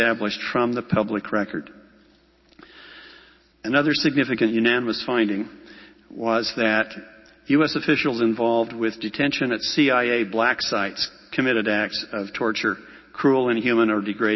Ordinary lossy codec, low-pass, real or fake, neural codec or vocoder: MP3, 24 kbps; 7.2 kHz; real; none